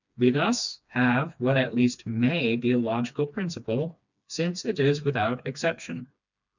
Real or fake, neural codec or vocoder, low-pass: fake; codec, 16 kHz, 2 kbps, FreqCodec, smaller model; 7.2 kHz